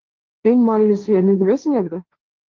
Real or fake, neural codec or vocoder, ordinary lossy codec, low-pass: fake; codec, 16 kHz, 1.1 kbps, Voila-Tokenizer; Opus, 32 kbps; 7.2 kHz